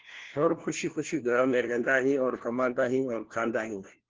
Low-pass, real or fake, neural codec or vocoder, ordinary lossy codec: 7.2 kHz; fake; codec, 16 kHz, 1 kbps, FunCodec, trained on LibriTTS, 50 frames a second; Opus, 16 kbps